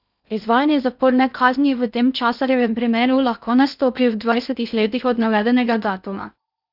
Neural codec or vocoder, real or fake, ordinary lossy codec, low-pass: codec, 16 kHz in and 24 kHz out, 0.6 kbps, FocalCodec, streaming, 2048 codes; fake; none; 5.4 kHz